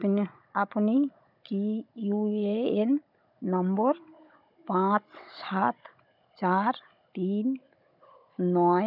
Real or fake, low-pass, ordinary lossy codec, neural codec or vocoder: fake; 5.4 kHz; none; codec, 16 kHz, 16 kbps, FunCodec, trained on Chinese and English, 50 frames a second